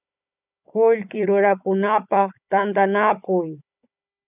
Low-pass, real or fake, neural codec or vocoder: 3.6 kHz; fake; codec, 16 kHz, 16 kbps, FunCodec, trained on Chinese and English, 50 frames a second